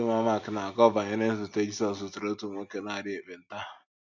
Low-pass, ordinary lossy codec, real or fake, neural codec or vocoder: 7.2 kHz; none; real; none